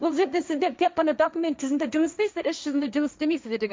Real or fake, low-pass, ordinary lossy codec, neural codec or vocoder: fake; none; none; codec, 16 kHz, 1.1 kbps, Voila-Tokenizer